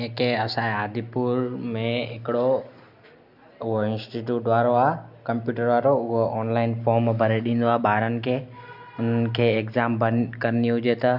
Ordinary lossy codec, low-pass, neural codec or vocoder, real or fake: MP3, 48 kbps; 5.4 kHz; none; real